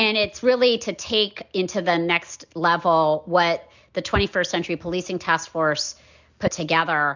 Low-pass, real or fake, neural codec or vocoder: 7.2 kHz; real; none